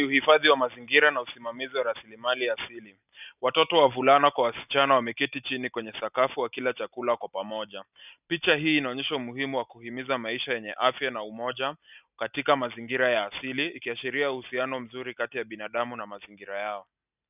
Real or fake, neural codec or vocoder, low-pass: real; none; 3.6 kHz